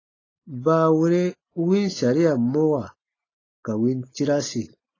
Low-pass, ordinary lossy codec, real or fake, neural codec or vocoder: 7.2 kHz; AAC, 32 kbps; real; none